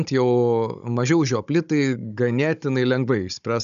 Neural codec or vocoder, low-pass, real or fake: codec, 16 kHz, 16 kbps, FunCodec, trained on Chinese and English, 50 frames a second; 7.2 kHz; fake